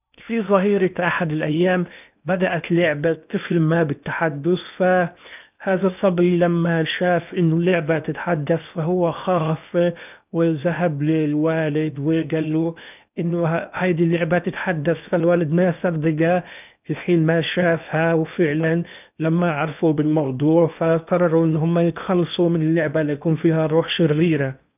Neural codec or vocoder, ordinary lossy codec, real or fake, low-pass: codec, 16 kHz in and 24 kHz out, 0.8 kbps, FocalCodec, streaming, 65536 codes; none; fake; 3.6 kHz